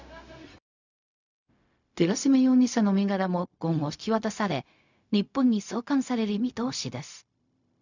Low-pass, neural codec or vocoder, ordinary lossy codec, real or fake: 7.2 kHz; codec, 16 kHz, 0.4 kbps, LongCat-Audio-Codec; none; fake